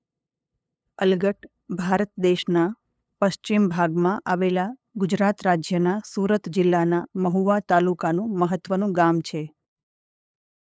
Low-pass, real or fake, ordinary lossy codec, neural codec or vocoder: none; fake; none; codec, 16 kHz, 8 kbps, FunCodec, trained on LibriTTS, 25 frames a second